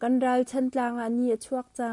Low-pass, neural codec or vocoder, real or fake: 10.8 kHz; none; real